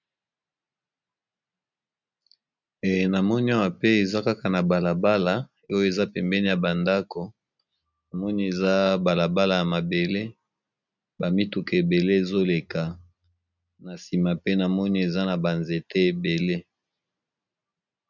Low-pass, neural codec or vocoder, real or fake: 7.2 kHz; none; real